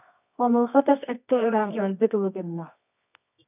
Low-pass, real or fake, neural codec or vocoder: 3.6 kHz; fake; codec, 24 kHz, 0.9 kbps, WavTokenizer, medium music audio release